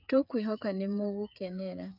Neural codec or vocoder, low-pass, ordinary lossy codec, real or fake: codec, 16 kHz, 8 kbps, FreqCodec, smaller model; 5.4 kHz; none; fake